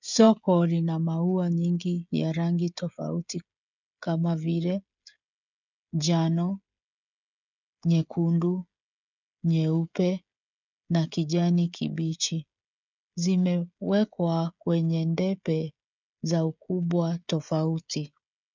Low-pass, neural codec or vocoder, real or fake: 7.2 kHz; codec, 16 kHz, 16 kbps, FreqCodec, smaller model; fake